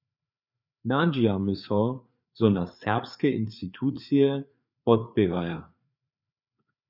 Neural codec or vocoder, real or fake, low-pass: codec, 16 kHz, 4 kbps, FreqCodec, larger model; fake; 5.4 kHz